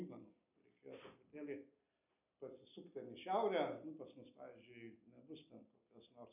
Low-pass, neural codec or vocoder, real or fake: 3.6 kHz; none; real